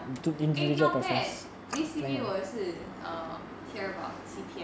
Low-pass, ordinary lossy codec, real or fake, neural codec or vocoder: none; none; real; none